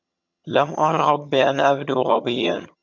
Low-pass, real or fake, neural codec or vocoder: 7.2 kHz; fake; vocoder, 22.05 kHz, 80 mel bands, HiFi-GAN